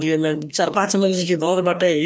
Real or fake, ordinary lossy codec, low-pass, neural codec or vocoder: fake; none; none; codec, 16 kHz, 1 kbps, FreqCodec, larger model